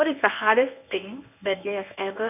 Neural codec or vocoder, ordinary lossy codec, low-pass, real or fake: codec, 24 kHz, 0.9 kbps, WavTokenizer, medium speech release version 1; none; 3.6 kHz; fake